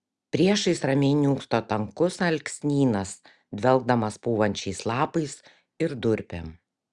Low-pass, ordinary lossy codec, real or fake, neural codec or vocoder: 10.8 kHz; Opus, 64 kbps; real; none